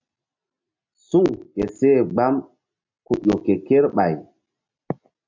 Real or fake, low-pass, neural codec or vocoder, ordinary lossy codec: real; 7.2 kHz; none; MP3, 64 kbps